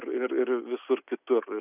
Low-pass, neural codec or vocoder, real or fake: 3.6 kHz; none; real